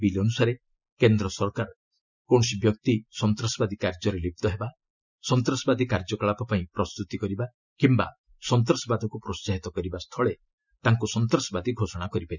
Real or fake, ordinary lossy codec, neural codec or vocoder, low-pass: real; none; none; 7.2 kHz